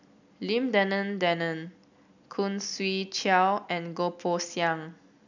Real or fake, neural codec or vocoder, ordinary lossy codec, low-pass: real; none; none; 7.2 kHz